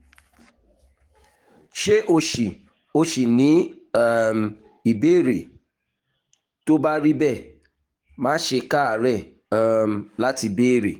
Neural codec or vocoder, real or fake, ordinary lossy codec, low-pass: codec, 44.1 kHz, 7.8 kbps, DAC; fake; Opus, 24 kbps; 19.8 kHz